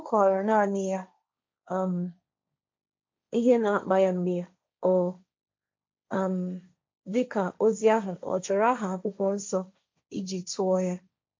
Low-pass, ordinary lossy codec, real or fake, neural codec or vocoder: 7.2 kHz; MP3, 48 kbps; fake; codec, 16 kHz, 1.1 kbps, Voila-Tokenizer